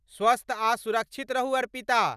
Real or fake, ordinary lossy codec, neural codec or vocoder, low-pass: real; none; none; 14.4 kHz